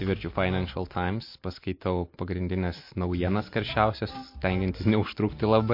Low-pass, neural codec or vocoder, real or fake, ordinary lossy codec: 5.4 kHz; none; real; MP3, 32 kbps